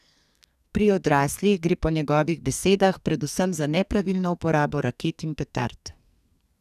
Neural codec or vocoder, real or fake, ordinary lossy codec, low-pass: codec, 44.1 kHz, 2.6 kbps, SNAC; fake; none; 14.4 kHz